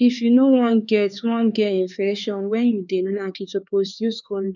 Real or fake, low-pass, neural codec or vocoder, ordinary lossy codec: fake; 7.2 kHz; codec, 16 kHz, 4 kbps, X-Codec, HuBERT features, trained on LibriSpeech; none